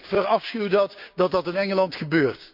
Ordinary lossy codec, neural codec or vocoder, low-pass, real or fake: none; codec, 16 kHz, 6 kbps, DAC; 5.4 kHz; fake